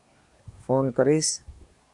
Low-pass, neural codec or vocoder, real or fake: 10.8 kHz; codec, 24 kHz, 1 kbps, SNAC; fake